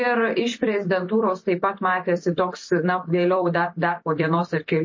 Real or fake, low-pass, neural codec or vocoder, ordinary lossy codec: fake; 7.2 kHz; vocoder, 44.1 kHz, 128 mel bands every 256 samples, BigVGAN v2; MP3, 32 kbps